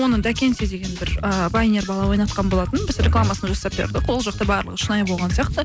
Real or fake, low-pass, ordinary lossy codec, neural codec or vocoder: real; none; none; none